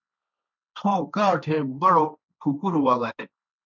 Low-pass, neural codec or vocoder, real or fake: 7.2 kHz; codec, 16 kHz, 1.1 kbps, Voila-Tokenizer; fake